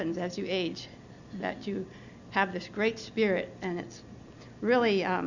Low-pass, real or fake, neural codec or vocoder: 7.2 kHz; real; none